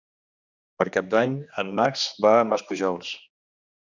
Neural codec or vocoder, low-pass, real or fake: codec, 16 kHz, 2 kbps, X-Codec, HuBERT features, trained on general audio; 7.2 kHz; fake